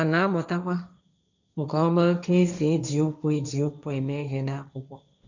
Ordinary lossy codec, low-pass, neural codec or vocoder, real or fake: none; 7.2 kHz; codec, 16 kHz, 1.1 kbps, Voila-Tokenizer; fake